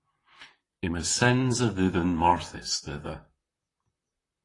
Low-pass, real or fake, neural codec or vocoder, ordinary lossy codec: 10.8 kHz; fake; vocoder, 44.1 kHz, 128 mel bands, Pupu-Vocoder; AAC, 32 kbps